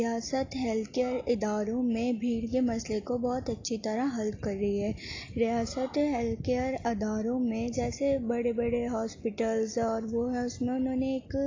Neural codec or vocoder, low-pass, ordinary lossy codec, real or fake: none; 7.2 kHz; AAC, 32 kbps; real